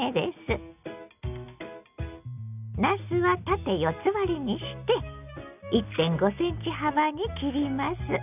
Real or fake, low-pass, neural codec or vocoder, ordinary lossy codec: real; 3.6 kHz; none; none